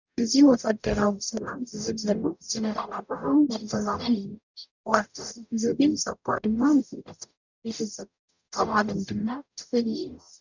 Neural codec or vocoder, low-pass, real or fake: codec, 44.1 kHz, 0.9 kbps, DAC; 7.2 kHz; fake